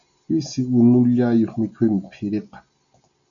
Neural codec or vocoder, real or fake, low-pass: none; real; 7.2 kHz